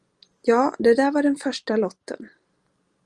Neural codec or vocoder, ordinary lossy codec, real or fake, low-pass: none; Opus, 24 kbps; real; 10.8 kHz